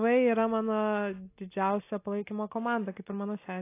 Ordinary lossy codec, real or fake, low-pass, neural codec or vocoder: AAC, 24 kbps; real; 3.6 kHz; none